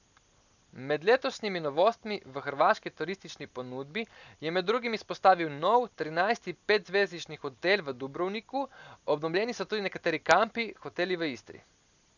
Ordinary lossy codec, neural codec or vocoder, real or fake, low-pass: none; none; real; 7.2 kHz